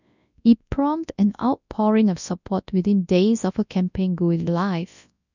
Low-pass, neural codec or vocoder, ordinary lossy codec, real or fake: 7.2 kHz; codec, 24 kHz, 0.9 kbps, WavTokenizer, large speech release; none; fake